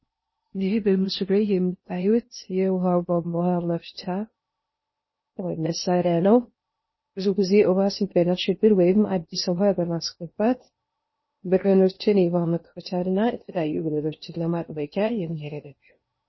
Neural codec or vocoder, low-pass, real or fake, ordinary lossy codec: codec, 16 kHz in and 24 kHz out, 0.6 kbps, FocalCodec, streaming, 4096 codes; 7.2 kHz; fake; MP3, 24 kbps